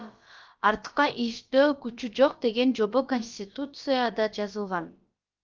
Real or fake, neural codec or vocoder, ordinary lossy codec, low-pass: fake; codec, 16 kHz, about 1 kbps, DyCAST, with the encoder's durations; Opus, 24 kbps; 7.2 kHz